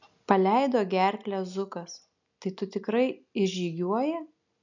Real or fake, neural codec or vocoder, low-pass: real; none; 7.2 kHz